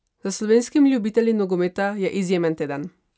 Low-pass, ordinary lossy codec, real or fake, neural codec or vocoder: none; none; real; none